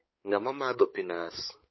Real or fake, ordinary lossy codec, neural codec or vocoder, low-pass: fake; MP3, 24 kbps; codec, 16 kHz, 4 kbps, X-Codec, HuBERT features, trained on balanced general audio; 7.2 kHz